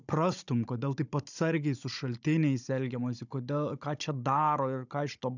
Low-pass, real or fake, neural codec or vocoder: 7.2 kHz; fake; codec, 16 kHz, 16 kbps, FunCodec, trained on Chinese and English, 50 frames a second